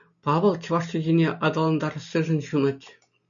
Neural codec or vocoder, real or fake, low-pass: none; real; 7.2 kHz